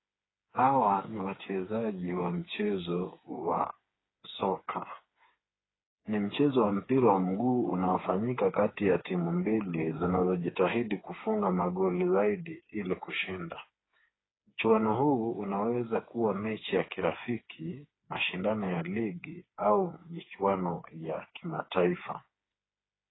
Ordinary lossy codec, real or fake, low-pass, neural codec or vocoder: AAC, 16 kbps; fake; 7.2 kHz; codec, 16 kHz, 4 kbps, FreqCodec, smaller model